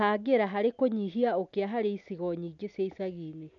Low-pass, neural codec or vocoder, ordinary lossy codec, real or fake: 7.2 kHz; none; none; real